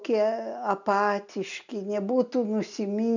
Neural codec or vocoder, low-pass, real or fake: none; 7.2 kHz; real